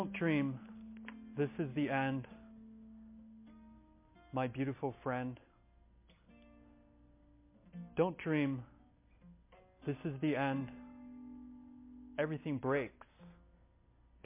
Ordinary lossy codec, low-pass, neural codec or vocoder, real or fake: MP3, 24 kbps; 3.6 kHz; none; real